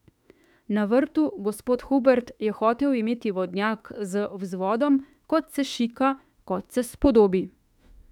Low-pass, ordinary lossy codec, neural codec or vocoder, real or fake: 19.8 kHz; none; autoencoder, 48 kHz, 32 numbers a frame, DAC-VAE, trained on Japanese speech; fake